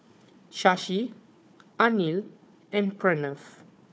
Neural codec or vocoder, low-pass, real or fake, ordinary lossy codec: codec, 16 kHz, 4 kbps, FunCodec, trained on Chinese and English, 50 frames a second; none; fake; none